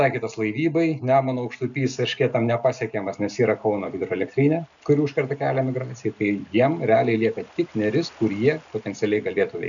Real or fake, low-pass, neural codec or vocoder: real; 7.2 kHz; none